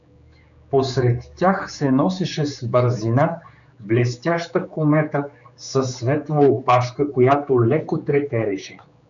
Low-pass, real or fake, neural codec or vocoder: 7.2 kHz; fake; codec, 16 kHz, 4 kbps, X-Codec, HuBERT features, trained on general audio